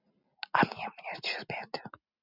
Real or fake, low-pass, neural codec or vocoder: real; 5.4 kHz; none